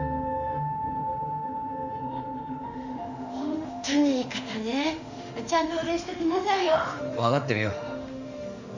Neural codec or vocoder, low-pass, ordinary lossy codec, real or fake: autoencoder, 48 kHz, 32 numbers a frame, DAC-VAE, trained on Japanese speech; 7.2 kHz; none; fake